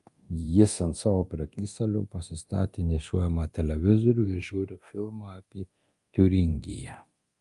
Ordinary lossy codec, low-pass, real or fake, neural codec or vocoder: Opus, 32 kbps; 10.8 kHz; fake; codec, 24 kHz, 0.9 kbps, DualCodec